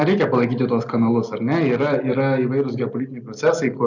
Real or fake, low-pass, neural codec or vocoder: real; 7.2 kHz; none